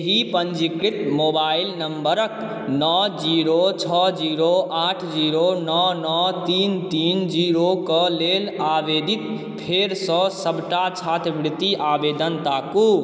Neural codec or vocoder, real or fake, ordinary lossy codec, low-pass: none; real; none; none